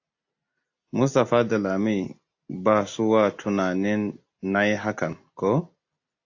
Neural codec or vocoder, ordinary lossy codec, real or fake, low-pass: none; AAC, 48 kbps; real; 7.2 kHz